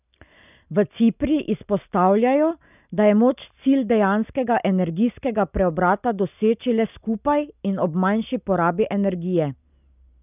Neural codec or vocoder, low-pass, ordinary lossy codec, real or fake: none; 3.6 kHz; none; real